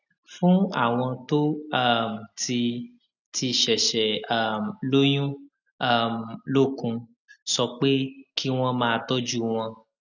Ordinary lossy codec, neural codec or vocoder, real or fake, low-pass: none; none; real; 7.2 kHz